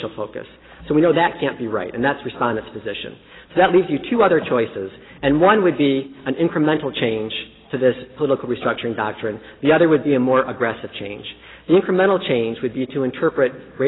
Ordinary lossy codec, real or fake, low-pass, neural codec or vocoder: AAC, 16 kbps; real; 7.2 kHz; none